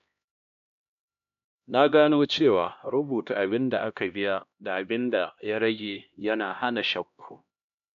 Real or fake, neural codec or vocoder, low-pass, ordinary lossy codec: fake; codec, 16 kHz, 1 kbps, X-Codec, HuBERT features, trained on LibriSpeech; 7.2 kHz; none